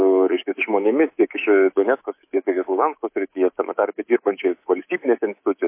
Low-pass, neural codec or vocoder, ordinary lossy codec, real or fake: 3.6 kHz; codec, 24 kHz, 3.1 kbps, DualCodec; MP3, 24 kbps; fake